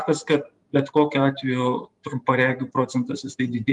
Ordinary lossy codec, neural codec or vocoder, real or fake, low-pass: Opus, 32 kbps; vocoder, 44.1 kHz, 128 mel bands every 512 samples, BigVGAN v2; fake; 10.8 kHz